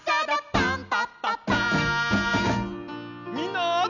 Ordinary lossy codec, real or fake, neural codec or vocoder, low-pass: none; real; none; 7.2 kHz